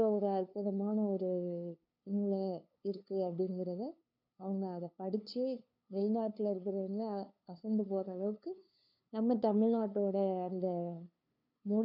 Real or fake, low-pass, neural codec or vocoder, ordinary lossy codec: fake; 5.4 kHz; codec, 16 kHz, 2 kbps, FunCodec, trained on LibriTTS, 25 frames a second; none